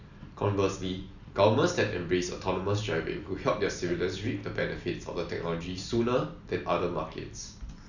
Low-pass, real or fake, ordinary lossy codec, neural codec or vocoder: 7.2 kHz; real; none; none